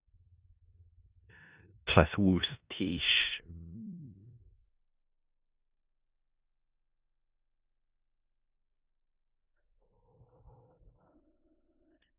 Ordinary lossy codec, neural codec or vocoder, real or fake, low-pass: Opus, 64 kbps; codec, 16 kHz in and 24 kHz out, 0.4 kbps, LongCat-Audio-Codec, four codebook decoder; fake; 3.6 kHz